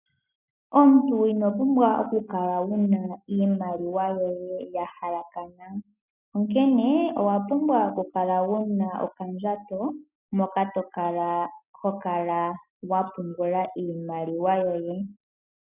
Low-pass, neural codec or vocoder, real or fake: 3.6 kHz; none; real